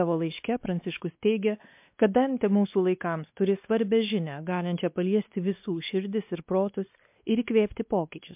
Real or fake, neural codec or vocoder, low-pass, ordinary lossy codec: fake; codec, 16 kHz, 2 kbps, X-Codec, WavLM features, trained on Multilingual LibriSpeech; 3.6 kHz; MP3, 32 kbps